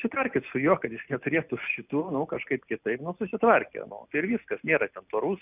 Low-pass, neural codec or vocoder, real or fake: 3.6 kHz; none; real